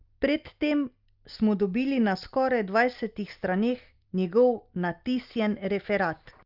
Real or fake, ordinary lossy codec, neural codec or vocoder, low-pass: real; Opus, 24 kbps; none; 5.4 kHz